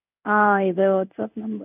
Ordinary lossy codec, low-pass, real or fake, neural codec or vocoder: none; 3.6 kHz; fake; codec, 16 kHz in and 24 kHz out, 1 kbps, XY-Tokenizer